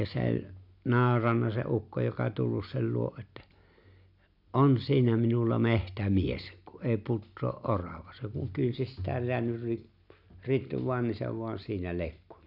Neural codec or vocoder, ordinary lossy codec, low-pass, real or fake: none; none; 5.4 kHz; real